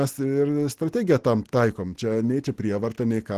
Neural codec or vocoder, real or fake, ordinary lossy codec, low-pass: none; real; Opus, 16 kbps; 14.4 kHz